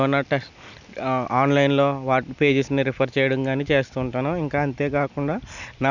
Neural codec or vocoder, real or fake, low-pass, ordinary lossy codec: none; real; 7.2 kHz; none